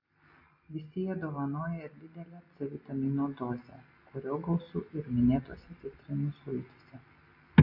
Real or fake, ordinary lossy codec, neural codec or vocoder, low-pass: real; AAC, 48 kbps; none; 5.4 kHz